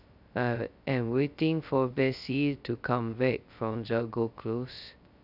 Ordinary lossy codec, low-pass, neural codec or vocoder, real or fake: none; 5.4 kHz; codec, 16 kHz, 0.2 kbps, FocalCodec; fake